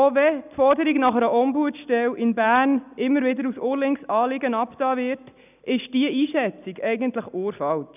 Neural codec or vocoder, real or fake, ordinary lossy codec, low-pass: none; real; none; 3.6 kHz